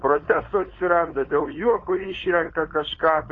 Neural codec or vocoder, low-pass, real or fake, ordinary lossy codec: codec, 16 kHz, 4.8 kbps, FACodec; 7.2 kHz; fake; AAC, 32 kbps